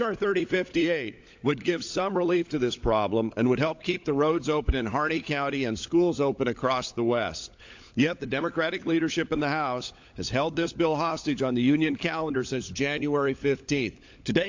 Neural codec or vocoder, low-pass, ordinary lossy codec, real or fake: codec, 16 kHz, 16 kbps, FunCodec, trained on LibriTTS, 50 frames a second; 7.2 kHz; AAC, 48 kbps; fake